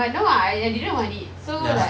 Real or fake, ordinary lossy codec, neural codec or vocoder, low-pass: real; none; none; none